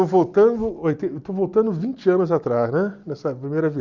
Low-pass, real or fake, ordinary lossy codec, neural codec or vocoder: 7.2 kHz; real; Opus, 64 kbps; none